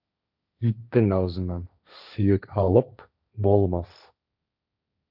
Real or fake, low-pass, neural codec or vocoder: fake; 5.4 kHz; codec, 16 kHz, 1.1 kbps, Voila-Tokenizer